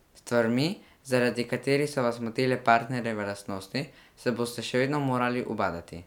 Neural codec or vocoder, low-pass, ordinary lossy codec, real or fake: none; 19.8 kHz; none; real